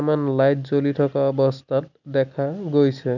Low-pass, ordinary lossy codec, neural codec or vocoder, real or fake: 7.2 kHz; none; none; real